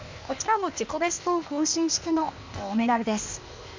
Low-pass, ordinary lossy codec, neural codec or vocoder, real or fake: 7.2 kHz; MP3, 64 kbps; codec, 16 kHz, 0.8 kbps, ZipCodec; fake